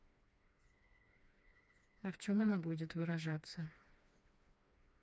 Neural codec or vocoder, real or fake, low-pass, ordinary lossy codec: codec, 16 kHz, 2 kbps, FreqCodec, smaller model; fake; none; none